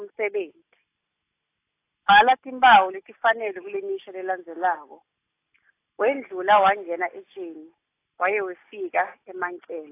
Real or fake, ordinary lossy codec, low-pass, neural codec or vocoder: real; AAC, 24 kbps; 3.6 kHz; none